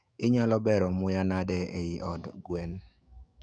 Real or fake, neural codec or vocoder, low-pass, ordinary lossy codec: fake; autoencoder, 48 kHz, 128 numbers a frame, DAC-VAE, trained on Japanese speech; 9.9 kHz; none